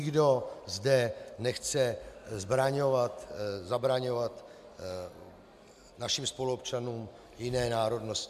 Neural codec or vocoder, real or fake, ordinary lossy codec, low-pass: none; real; MP3, 96 kbps; 14.4 kHz